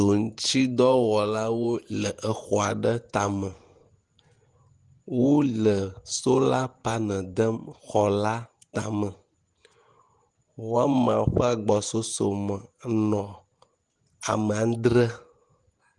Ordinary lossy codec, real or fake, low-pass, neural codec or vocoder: Opus, 24 kbps; fake; 10.8 kHz; vocoder, 48 kHz, 128 mel bands, Vocos